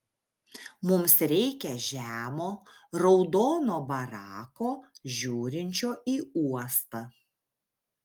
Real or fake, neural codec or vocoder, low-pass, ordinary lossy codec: real; none; 19.8 kHz; Opus, 32 kbps